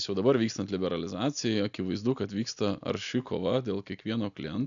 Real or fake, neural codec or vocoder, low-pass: real; none; 7.2 kHz